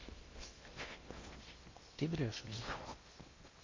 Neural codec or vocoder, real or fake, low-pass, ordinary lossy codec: codec, 16 kHz in and 24 kHz out, 0.8 kbps, FocalCodec, streaming, 65536 codes; fake; 7.2 kHz; MP3, 32 kbps